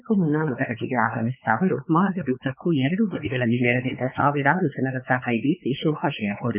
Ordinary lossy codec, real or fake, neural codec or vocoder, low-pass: none; fake; codec, 16 kHz, 4 kbps, X-Codec, WavLM features, trained on Multilingual LibriSpeech; 3.6 kHz